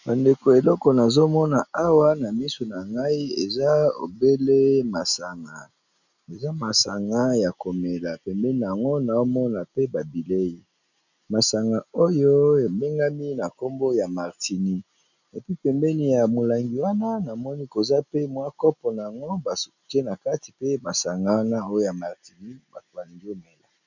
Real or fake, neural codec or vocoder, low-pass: real; none; 7.2 kHz